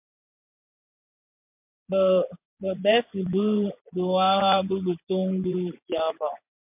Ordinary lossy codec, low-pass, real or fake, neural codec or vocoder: MP3, 32 kbps; 3.6 kHz; fake; vocoder, 24 kHz, 100 mel bands, Vocos